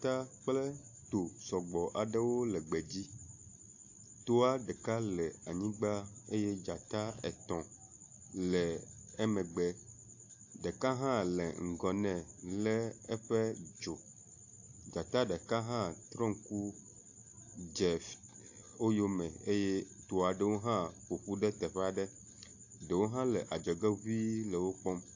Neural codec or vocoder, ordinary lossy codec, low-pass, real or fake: none; AAC, 48 kbps; 7.2 kHz; real